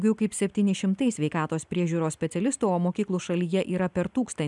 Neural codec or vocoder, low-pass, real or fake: none; 10.8 kHz; real